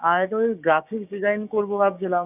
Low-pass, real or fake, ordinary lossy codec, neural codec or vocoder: 3.6 kHz; fake; none; codec, 16 kHz, 6 kbps, DAC